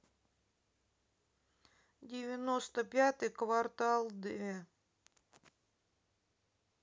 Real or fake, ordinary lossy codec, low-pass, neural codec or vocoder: real; none; none; none